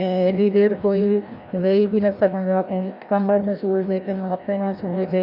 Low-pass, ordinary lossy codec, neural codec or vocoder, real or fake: 5.4 kHz; none; codec, 16 kHz, 1 kbps, FreqCodec, larger model; fake